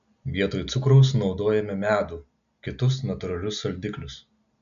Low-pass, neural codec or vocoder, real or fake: 7.2 kHz; none; real